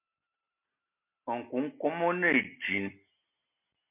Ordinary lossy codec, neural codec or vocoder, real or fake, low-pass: MP3, 32 kbps; none; real; 3.6 kHz